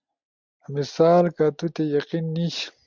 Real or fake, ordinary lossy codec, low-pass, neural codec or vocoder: real; MP3, 64 kbps; 7.2 kHz; none